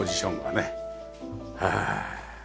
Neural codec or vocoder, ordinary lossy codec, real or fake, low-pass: none; none; real; none